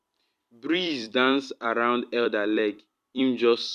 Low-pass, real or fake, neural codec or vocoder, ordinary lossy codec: 14.4 kHz; fake; vocoder, 44.1 kHz, 128 mel bands every 256 samples, BigVGAN v2; none